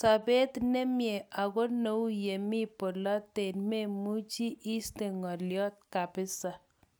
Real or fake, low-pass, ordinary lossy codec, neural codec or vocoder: real; none; none; none